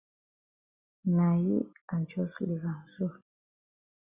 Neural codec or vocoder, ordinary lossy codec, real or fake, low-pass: none; Opus, 64 kbps; real; 3.6 kHz